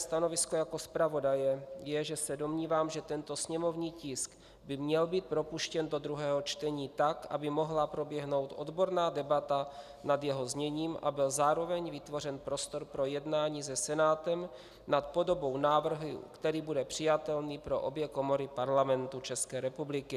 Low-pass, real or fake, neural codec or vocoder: 14.4 kHz; real; none